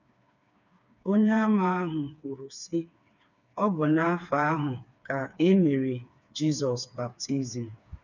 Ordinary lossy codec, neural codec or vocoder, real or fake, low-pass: none; codec, 16 kHz, 4 kbps, FreqCodec, smaller model; fake; 7.2 kHz